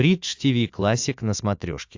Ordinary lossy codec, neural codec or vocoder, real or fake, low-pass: AAC, 48 kbps; autoencoder, 48 kHz, 128 numbers a frame, DAC-VAE, trained on Japanese speech; fake; 7.2 kHz